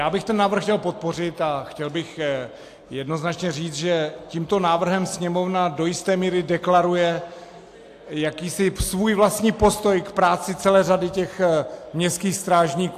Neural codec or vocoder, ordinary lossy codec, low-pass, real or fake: none; AAC, 64 kbps; 14.4 kHz; real